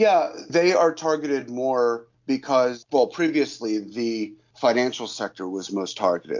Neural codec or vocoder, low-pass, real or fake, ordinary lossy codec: none; 7.2 kHz; real; MP3, 48 kbps